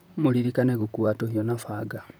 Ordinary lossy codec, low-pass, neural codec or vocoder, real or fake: none; none; vocoder, 44.1 kHz, 128 mel bands, Pupu-Vocoder; fake